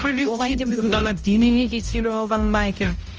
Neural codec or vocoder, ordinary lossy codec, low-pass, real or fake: codec, 16 kHz, 0.5 kbps, X-Codec, HuBERT features, trained on balanced general audio; Opus, 24 kbps; 7.2 kHz; fake